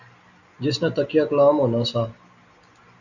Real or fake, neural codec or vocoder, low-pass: real; none; 7.2 kHz